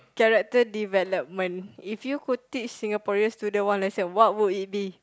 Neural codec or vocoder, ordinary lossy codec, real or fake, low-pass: none; none; real; none